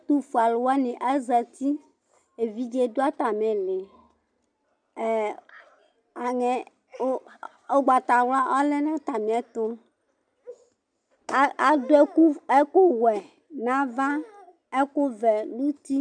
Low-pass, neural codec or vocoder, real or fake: 9.9 kHz; none; real